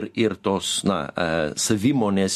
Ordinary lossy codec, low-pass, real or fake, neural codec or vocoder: MP3, 64 kbps; 14.4 kHz; real; none